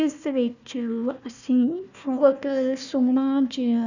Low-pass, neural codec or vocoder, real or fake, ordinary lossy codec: 7.2 kHz; codec, 16 kHz, 1 kbps, FunCodec, trained on Chinese and English, 50 frames a second; fake; none